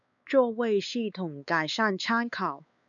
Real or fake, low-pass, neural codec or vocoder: fake; 7.2 kHz; codec, 16 kHz, 4 kbps, X-Codec, WavLM features, trained on Multilingual LibriSpeech